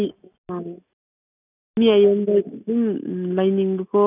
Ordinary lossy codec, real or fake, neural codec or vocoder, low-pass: none; real; none; 3.6 kHz